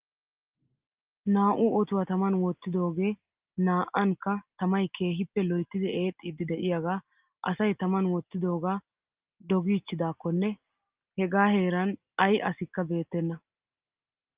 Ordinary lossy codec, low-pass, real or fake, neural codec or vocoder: Opus, 24 kbps; 3.6 kHz; real; none